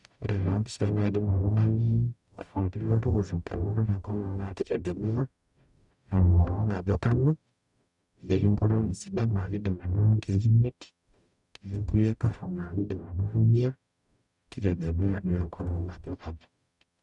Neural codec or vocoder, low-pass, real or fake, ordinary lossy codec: codec, 44.1 kHz, 0.9 kbps, DAC; 10.8 kHz; fake; none